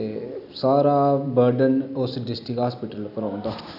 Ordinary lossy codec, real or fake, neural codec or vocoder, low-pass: none; real; none; 5.4 kHz